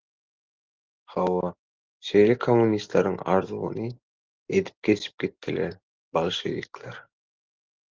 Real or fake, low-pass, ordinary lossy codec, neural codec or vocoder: real; 7.2 kHz; Opus, 16 kbps; none